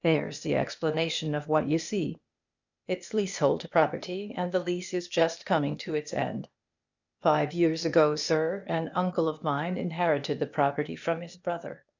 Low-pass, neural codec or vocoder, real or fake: 7.2 kHz; codec, 16 kHz, 0.8 kbps, ZipCodec; fake